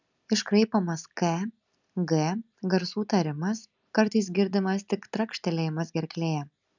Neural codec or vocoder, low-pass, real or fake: none; 7.2 kHz; real